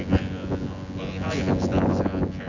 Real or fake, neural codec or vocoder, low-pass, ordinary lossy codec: fake; vocoder, 24 kHz, 100 mel bands, Vocos; 7.2 kHz; none